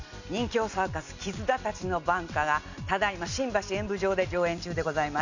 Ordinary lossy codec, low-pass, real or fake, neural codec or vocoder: none; 7.2 kHz; real; none